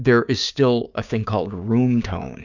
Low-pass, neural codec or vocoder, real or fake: 7.2 kHz; codec, 16 kHz, 6 kbps, DAC; fake